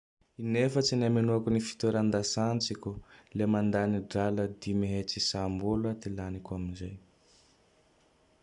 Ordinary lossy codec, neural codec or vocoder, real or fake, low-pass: none; none; real; 10.8 kHz